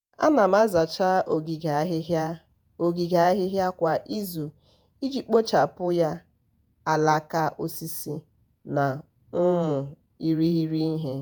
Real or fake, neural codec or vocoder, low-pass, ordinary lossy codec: fake; vocoder, 48 kHz, 128 mel bands, Vocos; none; none